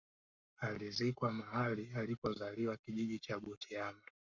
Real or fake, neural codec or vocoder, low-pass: fake; codec, 44.1 kHz, 7.8 kbps, Pupu-Codec; 7.2 kHz